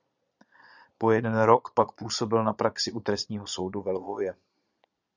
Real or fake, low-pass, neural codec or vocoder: fake; 7.2 kHz; vocoder, 22.05 kHz, 80 mel bands, Vocos